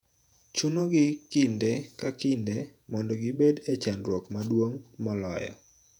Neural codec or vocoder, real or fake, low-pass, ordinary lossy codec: vocoder, 48 kHz, 128 mel bands, Vocos; fake; 19.8 kHz; none